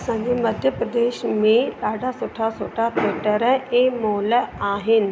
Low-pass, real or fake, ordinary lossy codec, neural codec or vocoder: none; real; none; none